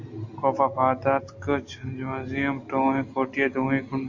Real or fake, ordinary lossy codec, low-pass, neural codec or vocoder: real; AAC, 48 kbps; 7.2 kHz; none